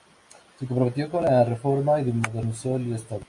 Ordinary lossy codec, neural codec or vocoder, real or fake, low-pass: MP3, 48 kbps; none; real; 10.8 kHz